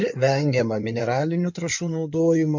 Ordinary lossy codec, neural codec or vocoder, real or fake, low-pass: MP3, 64 kbps; codec, 16 kHz in and 24 kHz out, 2.2 kbps, FireRedTTS-2 codec; fake; 7.2 kHz